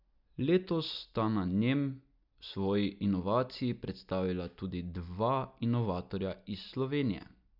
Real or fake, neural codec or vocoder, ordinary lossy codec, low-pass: real; none; none; 5.4 kHz